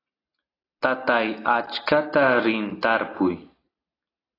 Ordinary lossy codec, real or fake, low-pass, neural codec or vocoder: AAC, 24 kbps; real; 5.4 kHz; none